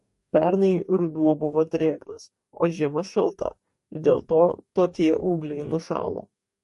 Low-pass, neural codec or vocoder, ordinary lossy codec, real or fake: 14.4 kHz; codec, 44.1 kHz, 2.6 kbps, DAC; MP3, 48 kbps; fake